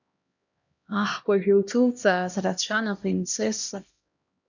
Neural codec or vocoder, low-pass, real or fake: codec, 16 kHz, 1 kbps, X-Codec, HuBERT features, trained on LibriSpeech; 7.2 kHz; fake